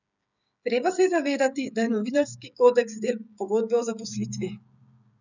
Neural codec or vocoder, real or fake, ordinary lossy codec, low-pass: codec, 16 kHz, 16 kbps, FreqCodec, smaller model; fake; none; 7.2 kHz